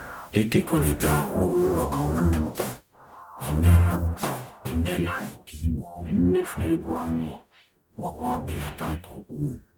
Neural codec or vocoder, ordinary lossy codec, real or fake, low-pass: codec, 44.1 kHz, 0.9 kbps, DAC; none; fake; 19.8 kHz